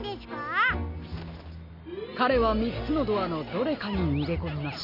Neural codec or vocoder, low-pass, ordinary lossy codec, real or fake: none; 5.4 kHz; none; real